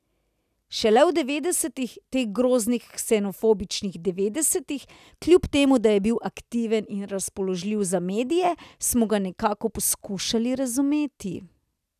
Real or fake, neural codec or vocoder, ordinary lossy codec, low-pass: real; none; none; 14.4 kHz